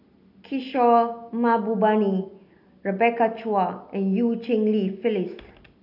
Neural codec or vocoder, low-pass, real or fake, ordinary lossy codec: none; 5.4 kHz; real; none